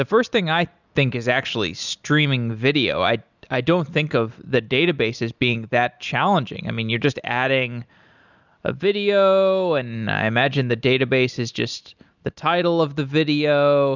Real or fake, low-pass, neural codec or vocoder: real; 7.2 kHz; none